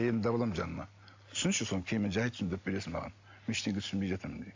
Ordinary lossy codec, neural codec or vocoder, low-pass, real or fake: MP3, 64 kbps; none; 7.2 kHz; real